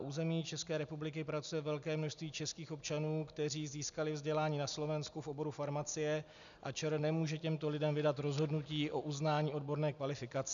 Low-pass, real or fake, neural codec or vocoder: 7.2 kHz; real; none